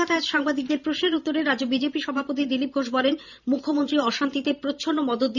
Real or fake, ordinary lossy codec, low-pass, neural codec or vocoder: fake; none; 7.2 kHz; vocoder, 44.1 kHz, 128 mel bands every 512 samples, BigVGAN v2